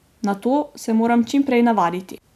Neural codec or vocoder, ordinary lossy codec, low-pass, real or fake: none; MP3, 96 kbps; 14.4 kHz; real